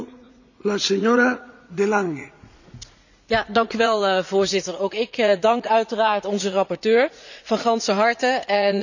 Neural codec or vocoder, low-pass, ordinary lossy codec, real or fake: vocoder, 44.1 kHz, 80 mel bands, Vocos; 7.2 kHz; none; fake